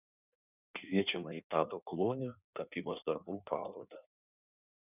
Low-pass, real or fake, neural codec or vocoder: 3.6 kHz; fake; codec, 16 kHz in and 24 kHz out, 1.1 kbps, FireRedTTS-2 codec